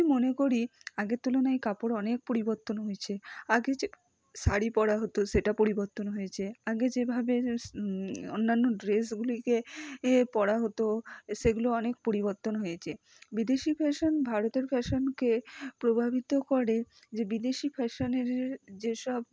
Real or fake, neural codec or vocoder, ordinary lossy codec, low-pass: real; none; none; none